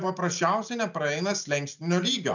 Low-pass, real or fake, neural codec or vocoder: 7.2 kHz; real; none